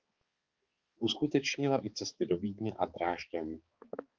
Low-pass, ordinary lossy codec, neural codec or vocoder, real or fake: 7.2 kHz; Opus, 24 kbps; codec, 16 kHz, 4 kbps, X-Codec, HuBERT features, trained on balanced general audio; fake